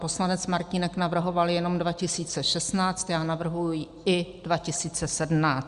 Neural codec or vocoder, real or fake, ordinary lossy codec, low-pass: none; real; Opus, 64 kbps; 10.8 kHz